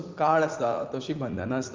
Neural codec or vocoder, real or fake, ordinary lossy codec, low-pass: vocoder, 44.1 kHz, 80 mel bands, Vocos; fake; Opus, 24 kbps; 7.2 kHz